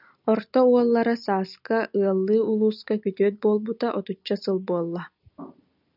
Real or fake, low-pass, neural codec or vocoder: real; 5.4 kHz; none